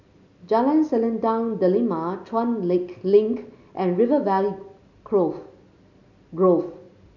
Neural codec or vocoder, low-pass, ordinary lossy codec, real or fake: none; 7.2 kHz; none; real